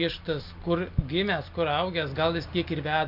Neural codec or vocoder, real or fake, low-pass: none; real; 5.4 kHz